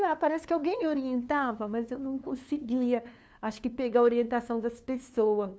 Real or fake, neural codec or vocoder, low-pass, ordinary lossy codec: fake; codec, 16 kHz, 2 kbps, FunCodec, trained on LibriTTS, 25 frames a second; none; none